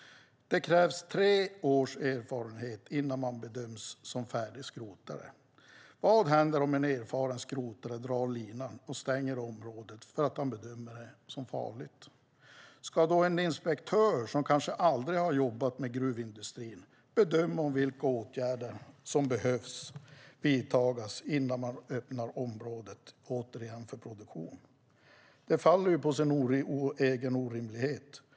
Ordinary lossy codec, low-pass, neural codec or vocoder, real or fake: none; none; none; real